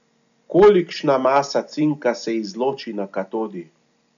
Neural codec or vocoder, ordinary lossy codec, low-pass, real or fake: none; none; 7.2 kHz; real